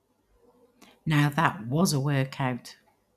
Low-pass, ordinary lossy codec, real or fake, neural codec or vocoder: 14.4 kHz; none; real; none